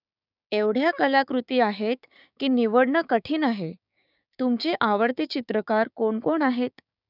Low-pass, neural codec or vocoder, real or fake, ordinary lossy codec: 5.4 kHz; codec, 16 kHz, 6 kbps, DAC; fake; none